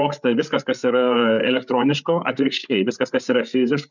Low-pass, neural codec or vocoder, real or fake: 7.2 kHz; codec, 16 kHz, 8 kbps, FreqCodec, larger model; fake